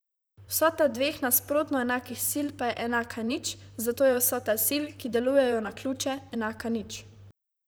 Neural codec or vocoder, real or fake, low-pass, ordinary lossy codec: vocoder, 44.1 kHz, 128 mel bands, Pupu-Vocoder; fake; none; none